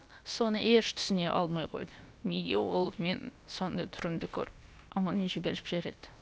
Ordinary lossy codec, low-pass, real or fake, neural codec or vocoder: none; none; fake; codec, 16 kHz, about 1 kbps, DyCAST, with the encoder's durations